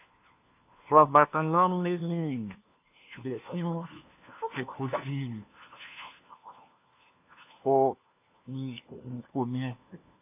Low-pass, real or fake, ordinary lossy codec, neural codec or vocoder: 3.6 kHz; fake; AAC, 32 kbps; codec, 16 kHz, 1 kbps, FunCodec, trained on LibriTTS, 50 frames a second